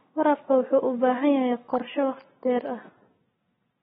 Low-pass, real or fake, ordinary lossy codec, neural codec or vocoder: 7.2 kHz; real; AAC, 16 kbps; none